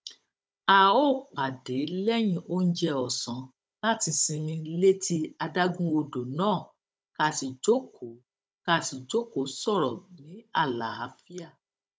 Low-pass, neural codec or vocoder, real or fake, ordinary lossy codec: none; codec, 16 kHz, 16 kbps, FunCodec, trained on Chinese and English, 50 frames a second; fake; none